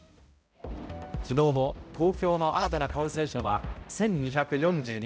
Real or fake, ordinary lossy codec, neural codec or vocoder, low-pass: fake; none; codec, 16 kHz, 0.5 kbps, X-Codec, HuBERT features, trained on balanced general audio; none